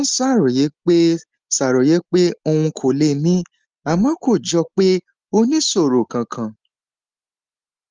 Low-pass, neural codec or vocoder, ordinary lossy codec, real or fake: 7.2 kHz; none; Opus, 24 kbps; real